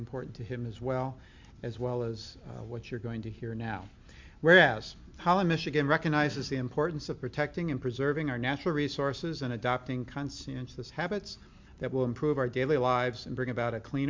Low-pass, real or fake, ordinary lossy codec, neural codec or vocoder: 7.2 kHz; real; MP3, 64 kbps; none